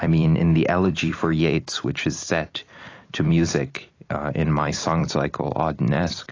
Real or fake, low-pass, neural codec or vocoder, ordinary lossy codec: fake; 7.2 kHz; vocoder, 44.1 kHz, 128 mel bands every 256 samples, BigVGAN v2; AAC, 32 kbps